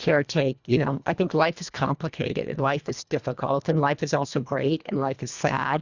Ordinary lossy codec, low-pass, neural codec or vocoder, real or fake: Opus, 64 kbps; 7.2 kHz; codec, 24 kHz, 1.5 kbps, HILCodec; fake